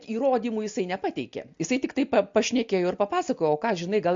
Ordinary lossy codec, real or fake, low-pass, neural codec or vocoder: MP3, 48 kbps; real; 7.2 kHz; none